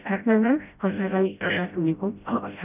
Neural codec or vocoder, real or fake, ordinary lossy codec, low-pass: codec, 16 kHz, 0.5 kbps, FreqCodec, smaller model; fake; none; 3.6 kHz